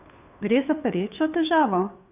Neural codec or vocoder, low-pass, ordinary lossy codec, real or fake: codec, 16 kHz, 2 kbps, FunCodec, trained on Chinese and English, 25 frames a second; 3.6 kHz; none; fake